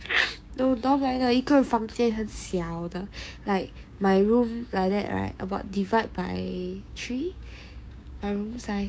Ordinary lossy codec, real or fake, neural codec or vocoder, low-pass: none; fake; codec, 16 kHz, 6 kbps, DAC; none